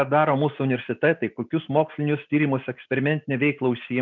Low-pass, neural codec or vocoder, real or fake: 7.2 kHz; none; real